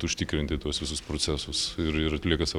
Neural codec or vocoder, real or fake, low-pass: none; real; 19.8 kHz